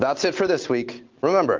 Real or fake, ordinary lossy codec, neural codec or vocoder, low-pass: real; Opus, 24 kbps; none; 7.2 kHz